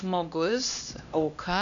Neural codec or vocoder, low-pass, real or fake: codec, 16 kHz, 1 kbps, X-Codec, HuBERT features, trained on LibriSpeech; 7.2 kHz; fake